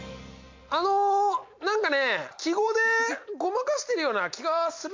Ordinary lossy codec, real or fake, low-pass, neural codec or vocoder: MP3, 48 kbps; real; 7.2 kHz; none